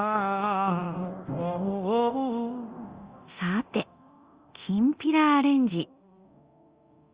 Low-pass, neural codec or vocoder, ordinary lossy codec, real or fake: 3.6 kHz; codec, 24 kHz, 0.9 kbps, DualCodec; Opus, 32 kbps; fake